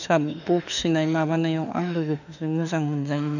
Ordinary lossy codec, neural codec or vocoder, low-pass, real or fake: none; autoencoder, 48 kHz, 32 numbers a frame, DAC-VAE, trained on Japanese speech; 7.2 kHz; fake